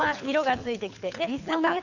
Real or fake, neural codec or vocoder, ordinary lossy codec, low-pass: fake; codec, 24 kHz, 6 kbps, HILCodec; none; 7.2 kHz